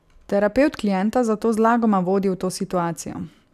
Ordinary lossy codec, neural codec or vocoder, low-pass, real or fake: none; none; 14.4 kHz; real